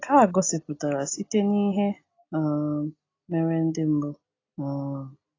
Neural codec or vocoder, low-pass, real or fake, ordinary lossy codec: none; 7.2 kHz; real; AAC, 32 kbps